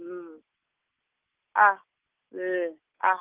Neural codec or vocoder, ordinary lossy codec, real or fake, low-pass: none; Opus, 32 kbps; real; 3.6 kHz